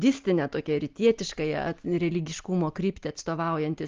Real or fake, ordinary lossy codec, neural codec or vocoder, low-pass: real; Opus, 32 kbps; none; 7.2 kHz